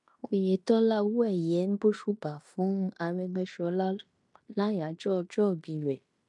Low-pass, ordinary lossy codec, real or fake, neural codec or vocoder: 10.8 kHz; MP3, 64 kbps; fake; codec, 16 kHz in and 24 kHz out, 0.9 kbps, LongCat-Audio-Codec, fine tuned four codebook decoder